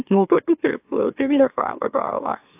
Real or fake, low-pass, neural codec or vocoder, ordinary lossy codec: fake; 3.6 kHz; autoencoder, 44.1 kHz, a latent of 192 numbers a frame, MeloTTS; AAC, 32 kbps